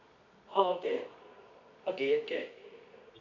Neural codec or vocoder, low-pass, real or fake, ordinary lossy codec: codec, 24 kHz, 0.9 kbps, WavTokenizer, medium music audio release; 7.2 kHz; fake; none